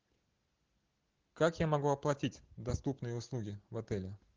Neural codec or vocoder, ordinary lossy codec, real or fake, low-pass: none; Opus, 16 kbps; real; 7.2 kHz